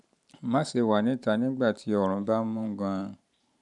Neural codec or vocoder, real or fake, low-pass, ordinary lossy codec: vocoder, 44.1 kHz, 128 mel bands every 512 samples, BigVGAN v2; fake; 10.8 kHz; none